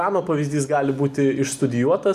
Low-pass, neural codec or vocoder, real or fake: 14.4 kHz; none; real